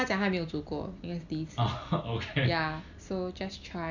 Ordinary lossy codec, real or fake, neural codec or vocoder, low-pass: none; real; none; 7.2 kHz